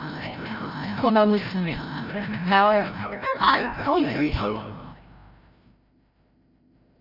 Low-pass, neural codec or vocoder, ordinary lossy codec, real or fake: 5.4 kHz; codec, 16 kHz, 0.5 kbps, FreqCodec, larger model; AAC, 48 kbps; fake